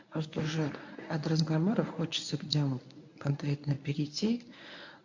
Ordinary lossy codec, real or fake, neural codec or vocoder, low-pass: AAC, 48 kbps; fake; codec, 24 kHz, 0.9 kbps, WavTokenizer, medium speech release version 1; 7.2 kHz